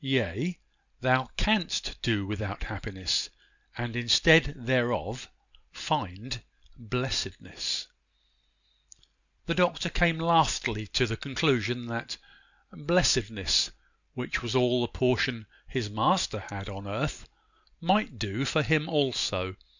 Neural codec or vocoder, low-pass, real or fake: none; 7.2 kHz; real